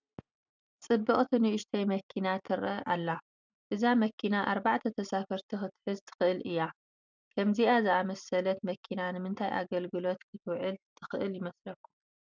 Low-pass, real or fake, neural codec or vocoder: 7.2 kHz; real; none